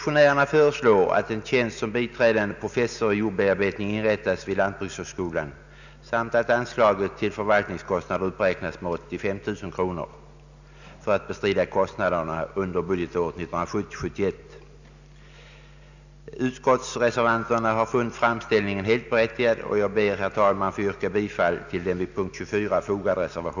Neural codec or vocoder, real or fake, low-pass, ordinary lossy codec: none; real; 7.2 kHz; none